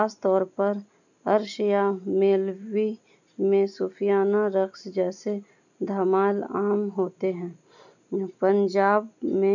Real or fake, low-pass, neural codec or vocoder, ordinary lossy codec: real; 7.2 kHz; none; none